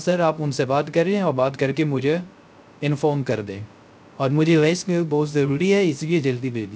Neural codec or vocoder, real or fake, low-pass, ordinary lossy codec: codec, 16 kHz, 0.3 kbps, FocalCodec; fake; none; none